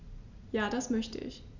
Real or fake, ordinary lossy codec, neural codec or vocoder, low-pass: real; none; none; 7.2 kHz